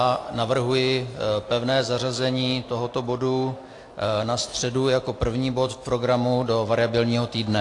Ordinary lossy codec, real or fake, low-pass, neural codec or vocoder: AAC, 48 kbps; real; 10.8 kHz; none